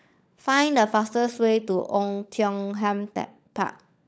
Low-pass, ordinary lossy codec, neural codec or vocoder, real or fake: none; none; codec, 16 kHz, 16 kbps, FunCodec, trained on LibriTTS, 50 frames a second; fake